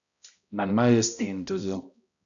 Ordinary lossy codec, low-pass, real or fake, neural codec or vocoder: MP3, 96 kbps; 7.2 kHz; fake; codec, 16 kHz, 0.5 kbps, X-Codec, HuBERT features, trained on balanced general audio